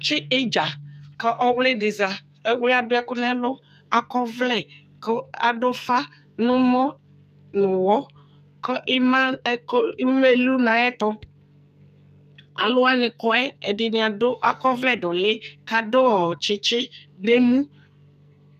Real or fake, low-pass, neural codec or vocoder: fake; 14.4 kHz; codec, 44.1 kHz, 2.6 kbps, SNAC